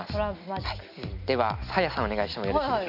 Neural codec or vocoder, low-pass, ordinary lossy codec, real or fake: none; 5.4 kHz; none; real